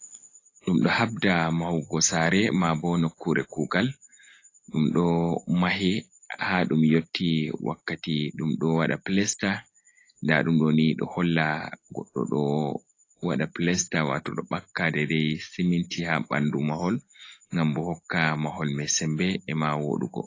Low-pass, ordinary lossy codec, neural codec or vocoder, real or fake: 7.2 kHz; AAC, 32 kbps; none; real